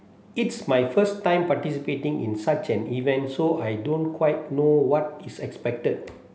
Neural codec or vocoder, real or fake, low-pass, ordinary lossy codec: none; real; none; none